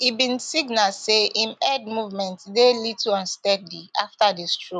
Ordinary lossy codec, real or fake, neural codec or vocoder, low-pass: none; real; none; 10.8 kHz